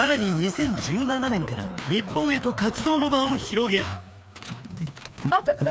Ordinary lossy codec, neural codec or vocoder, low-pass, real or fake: none; codec, 16 kHz, 2 kbps, FreqCodec, larger model; none; fake